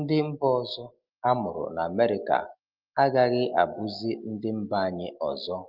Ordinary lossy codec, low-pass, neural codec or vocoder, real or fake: Opus, 32 kbps; 5.4 kHz; none; real